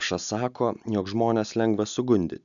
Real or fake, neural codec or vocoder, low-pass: real; none; 7.2 kHz